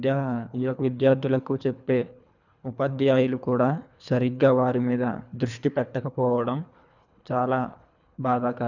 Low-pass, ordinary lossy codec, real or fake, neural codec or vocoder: 7.2 kHz; none; fake; codec, 24 kHz, 3 kbps, HILCodec